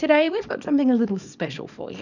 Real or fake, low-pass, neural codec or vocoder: fake; 7.2 kHz; codec, 24 kHz, 0.9 kbps, WavTokenizer, small release